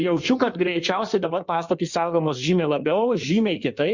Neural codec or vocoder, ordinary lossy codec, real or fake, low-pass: codec, 16 kHz in and 24 kHz out, 1.1 kbps, FireRedTTS-2 codec; Opus, 64 kbps; fake; 7.2 kHz